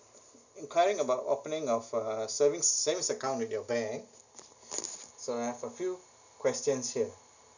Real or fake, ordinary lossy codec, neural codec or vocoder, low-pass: real; none; none; 7.2 kHz